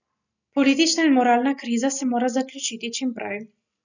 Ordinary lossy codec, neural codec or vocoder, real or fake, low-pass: none; none; real; 7.2 kHz